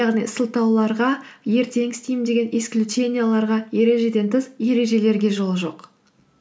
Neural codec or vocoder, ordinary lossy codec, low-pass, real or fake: none; none; none; real